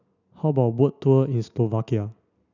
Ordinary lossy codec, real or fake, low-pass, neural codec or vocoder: none; real; 7.2 kHz; none